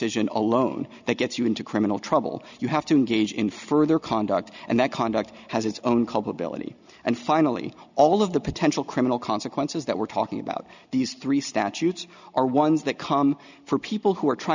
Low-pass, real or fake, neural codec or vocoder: 7.2 kHz; real; none